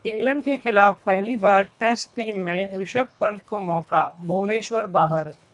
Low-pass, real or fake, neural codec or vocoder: 10.8 kHz; fake; codec, 24 kHz, 1.5 kbps, HILCodec